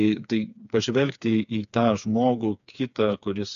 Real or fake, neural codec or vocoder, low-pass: fake; codec, 16 kHz, 4 kbps, FreqCodec, smaller model; 7.2 kHz